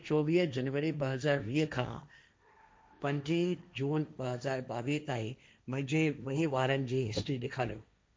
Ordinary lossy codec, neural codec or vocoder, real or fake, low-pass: none; codec, 16 kHz, 1.1 kbps, Voila-Tokenizer; fake; none